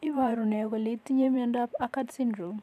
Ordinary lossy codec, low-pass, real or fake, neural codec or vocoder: none; 14.4 kHz; fake; vocoder, 44.1 kHz, 128 mel bands every 512 samples, BigVGAN v2